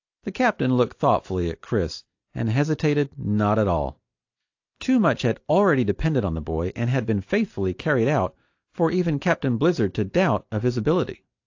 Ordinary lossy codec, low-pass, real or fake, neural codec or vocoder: AAC, 48 kbps; 7.2 kHz; real; none